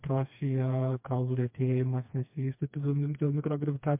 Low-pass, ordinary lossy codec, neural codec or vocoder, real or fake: 3.6 kHz; MP3, 32 kbps; codec, 16 kHz, 2 kbps, FreqCodec, smaller model; fake